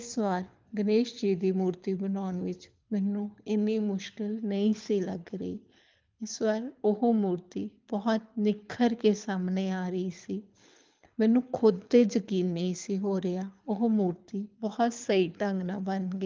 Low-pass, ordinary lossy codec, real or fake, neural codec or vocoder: 7.2 kHz; Opus, 24 kbps; fake; codec, 24 kHz, 6 kbps, HILCodec